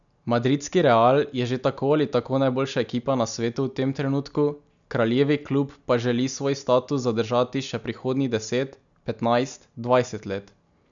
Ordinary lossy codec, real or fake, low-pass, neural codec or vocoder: none; real; 7.2 kHz; none